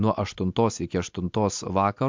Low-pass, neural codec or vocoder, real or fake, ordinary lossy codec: 7.2 kHz; none; real; MP3, 64 kbps